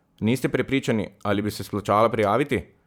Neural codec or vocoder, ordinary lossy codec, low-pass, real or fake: vocoder, 44.1 kHz, 128 mel bands every 512 samples, BigVGAN v2; none; none; fake